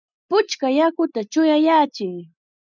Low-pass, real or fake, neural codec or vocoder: 7.2 kHz; real; none